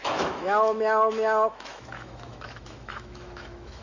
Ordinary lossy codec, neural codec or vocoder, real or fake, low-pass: none; autoencoder, 48 kHz, 128 numbers a frame, DAC-VAE, trained on Japanese speech; fake; 7.2 kHz